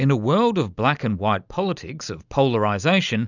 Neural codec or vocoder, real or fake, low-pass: none; real; 7.2 kHz